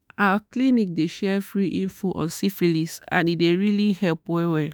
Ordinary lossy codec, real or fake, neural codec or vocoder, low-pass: none; fake; autoencoder, 48 kHz, 32 numbers a frame, DAC-VAE, trained on Japanese speech; none